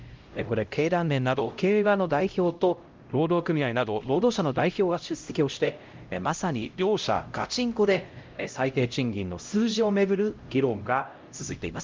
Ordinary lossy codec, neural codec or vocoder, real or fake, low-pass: Opus, 24 kbps; codec, 16 kHz, 0.5 kbps, X-Codec, HuBERT features, trained on LibriSpeech; fake; 7.2 kHz